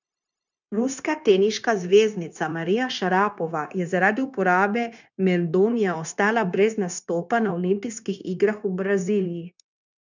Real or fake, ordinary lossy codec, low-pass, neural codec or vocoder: fake; none; 7.2 kHz; codec, 16 kHz, 0.9 kbps, LongCat-Audio-Codec